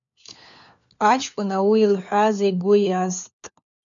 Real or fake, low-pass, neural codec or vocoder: fake; 7.2 kHz; codec, 16 kHz, 4 kbps, FunCodec, trained on LibriTTS, 50 frames a second